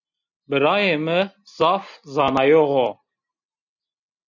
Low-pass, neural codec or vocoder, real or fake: 7.2 kHz; none; real